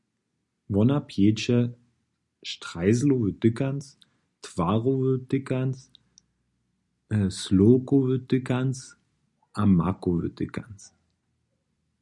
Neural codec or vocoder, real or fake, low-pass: none; real; 10.8 kHz